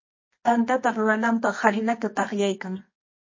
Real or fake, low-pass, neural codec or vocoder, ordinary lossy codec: fake; 7.2 kHz; codec, 24 kHz, 0.9 kbps, WavTokenizer, medium music audio release; MP3, 32 kbps